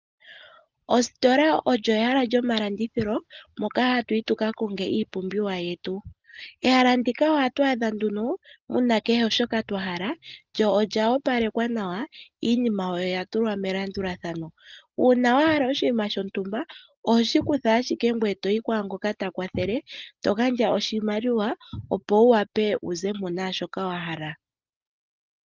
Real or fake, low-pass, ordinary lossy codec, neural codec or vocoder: real; 7.2 kHz; Opus, 32 kbps; none